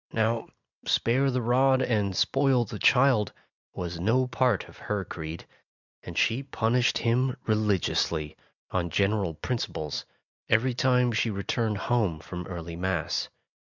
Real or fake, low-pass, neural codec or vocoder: real; 7.2 kHz; none